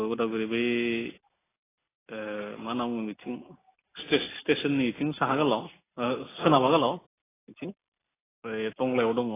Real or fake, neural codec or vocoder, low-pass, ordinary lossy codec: real; none; 3.6 kHz; AAC, 16 kbps